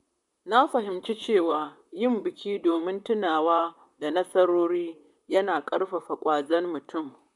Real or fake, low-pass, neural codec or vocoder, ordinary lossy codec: fake; 10.8 kHz; vocoder, 44.1 kHz, 128 mel bands, Pupu-Vocoder; MP3, 96 kbps